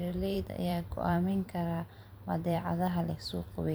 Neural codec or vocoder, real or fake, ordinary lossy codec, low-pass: vocoder, 44.1 kHz, 128 mel bands every 512 samples, BigVGAN v2; fake; none; none